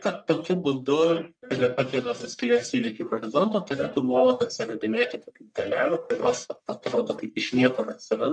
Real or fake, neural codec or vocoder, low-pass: fake; codec, 44.1 kHz, 1.7 kbps, Pupu-Codec; 9.9 kHz